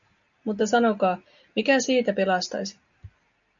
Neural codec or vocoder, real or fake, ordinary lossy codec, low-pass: none; real; MP3, 96 kbps; 7.2 kHz